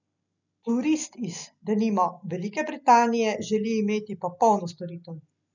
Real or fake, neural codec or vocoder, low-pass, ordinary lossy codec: real; none; 7.2 kHz; none